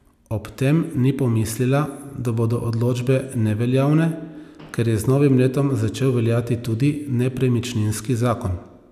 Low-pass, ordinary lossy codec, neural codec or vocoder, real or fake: 14.4 kHz; none; none; real